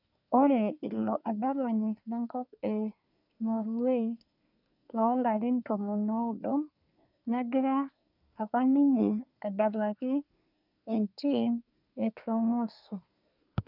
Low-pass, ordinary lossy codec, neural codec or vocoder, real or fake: 5.4 kHz; none; codec, 24 kHz, 1 kbps, SNAC; fake